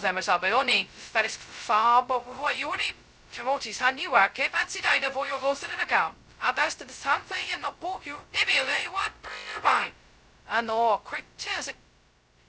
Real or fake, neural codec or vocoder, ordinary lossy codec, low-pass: fake; codec, 16 kHz, 0.2 kbps, FocalCodec; none; none